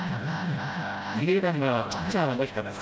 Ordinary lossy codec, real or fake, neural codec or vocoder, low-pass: none; fake; codec, 16 kHz, 0.5 kbps, FreqCodec, smaller model; none